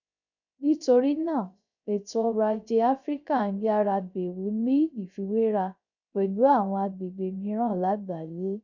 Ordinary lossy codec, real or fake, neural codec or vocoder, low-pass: none; fake; codec, 16 kHz, 0.3 kbps, FocalCodec; 7.2 kHz